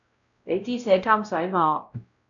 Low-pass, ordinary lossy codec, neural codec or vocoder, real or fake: 7.2 kHz; MP3, 64 kbps; codec, 16 kHz, 0.5 kbps, X-Codec, WavLM features, trained on Multilingual LibriSpeech; fake